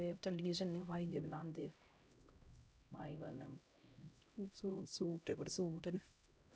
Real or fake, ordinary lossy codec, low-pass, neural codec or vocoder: fake; none; none; codec, 16 kHz, 0.5 kbps, X-Codec, HuBERT features, trained on LibriSpeech